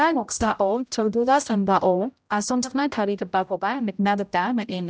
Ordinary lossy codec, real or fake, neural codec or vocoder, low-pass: none; fake; codec, 16 kHz, 0.5 kbps, X-Codec, HuBERT features, trained on general audio; none